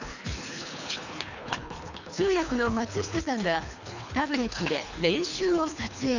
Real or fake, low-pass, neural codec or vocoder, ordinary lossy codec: fake; 7.2 kHz; codec, 24 kHz, 3 kbps, HILCodec; none